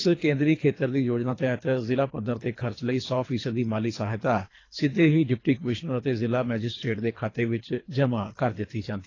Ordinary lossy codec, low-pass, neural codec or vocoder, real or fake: AAC, 32 kbps; 7.2 kHz; codec, 24 kHz, 3 kbps, HILCodec; fake